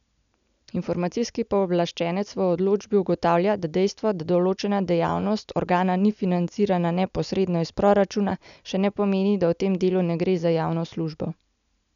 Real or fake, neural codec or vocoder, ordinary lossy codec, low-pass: real; none; none; 7.2 kHz